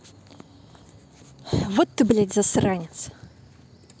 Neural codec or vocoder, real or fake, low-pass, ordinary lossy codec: none; real; none; none